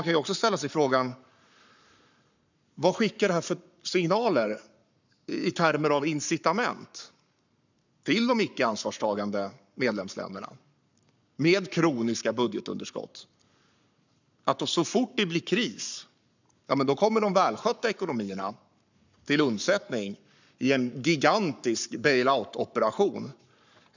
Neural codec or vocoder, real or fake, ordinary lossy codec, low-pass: codec, 44.1 kHz, 7.8 kbps, Pupu-Codec; fake; none; 7.2 kHz